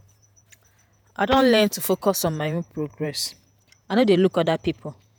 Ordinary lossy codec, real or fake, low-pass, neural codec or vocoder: none; fake; none; vocoder, 48 kHz, 128 mel bands, Vocos